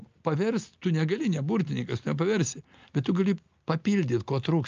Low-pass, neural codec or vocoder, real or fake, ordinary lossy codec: 7.2 kHz; none; real; Opus, 24 kbps